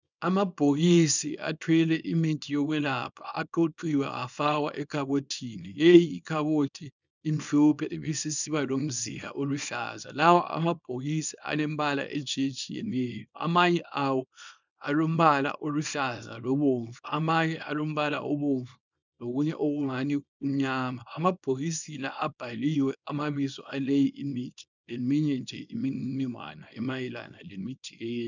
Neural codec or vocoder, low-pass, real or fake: codec, 24 kHz, 0.9 kbps, WavTokenizer, small release; 7.2 kHz; fake